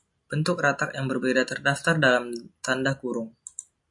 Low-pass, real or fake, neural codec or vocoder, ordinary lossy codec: 10.8 kHz; real; none; MP3, 64 kbps